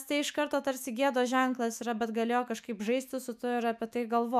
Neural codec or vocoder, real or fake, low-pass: autoencoder, 48 kHz, 128 numbers a frame, DAC-VAE, trained on Japanese speech; fake; 14.4 kHz